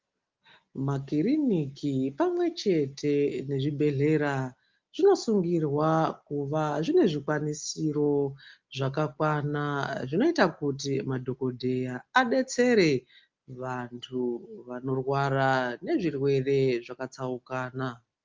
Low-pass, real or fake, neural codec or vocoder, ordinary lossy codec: 7.2 kHz; real; none; Opus, 24 kbps